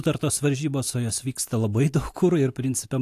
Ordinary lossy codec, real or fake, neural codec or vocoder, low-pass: AAC, 64 kbps; real; none; 14.4 kHz